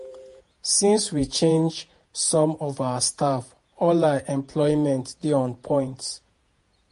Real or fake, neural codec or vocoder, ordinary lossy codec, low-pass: fake; vocoder, 48 kHz, 128 mel bands, Vocos; MP3, 48 kbps; 14.4 kHz